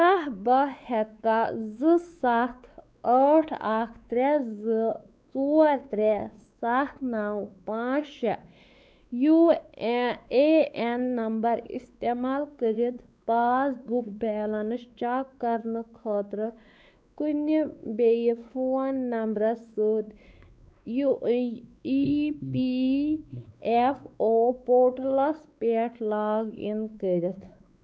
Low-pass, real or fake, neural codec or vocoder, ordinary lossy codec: none; fake; codec, 16 kHz, 4 kbps, X-Codec, WavLM features, trained on Multilingual LibriSpeech; none